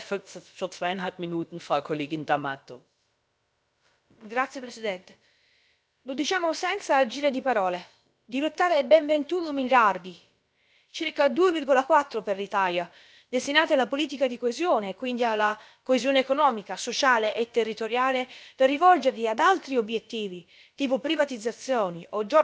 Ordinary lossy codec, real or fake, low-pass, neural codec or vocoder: none; fake; none; codec, 16 kHz, about 1 kbps, DyCAST, with the encoder's durations